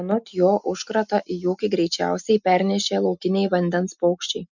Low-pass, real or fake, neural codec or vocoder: 7.2 kHz; real; none